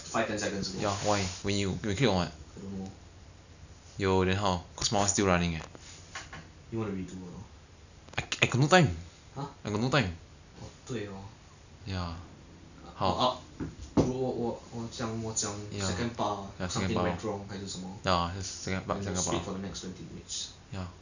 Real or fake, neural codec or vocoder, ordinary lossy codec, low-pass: real; none; none; 7.2 kHz